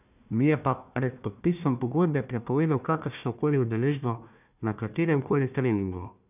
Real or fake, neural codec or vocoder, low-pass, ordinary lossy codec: fake; codec, 16 kHz, 1 kbps, FunCodec, trained on Chinese and English, 50 frames a second; 3.6 kHz; none